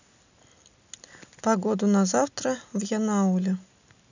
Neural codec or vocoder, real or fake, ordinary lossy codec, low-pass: none; real; none; 7.2 kHz